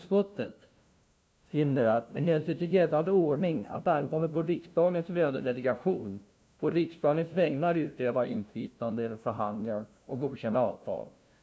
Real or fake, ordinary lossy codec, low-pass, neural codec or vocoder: fake; none; none; codec, 16 kHz, 0.5 kbps, FunCodec, trained on LibriTTS, 25 frames a second